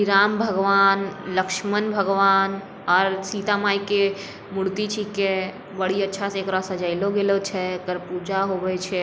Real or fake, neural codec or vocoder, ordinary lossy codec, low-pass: real; none; none; none